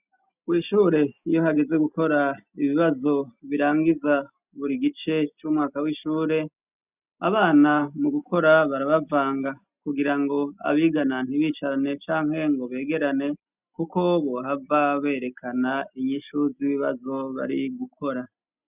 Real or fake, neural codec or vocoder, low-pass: real; none; 3.6 kHz